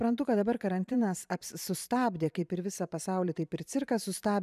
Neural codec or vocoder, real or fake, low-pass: vocoder, 44.1 kHz, 128 mel bands every 512 samples, BigVGAN v2; fake; 14.4 kHz